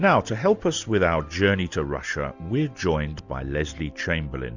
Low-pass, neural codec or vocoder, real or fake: 7.2 kHz; none; real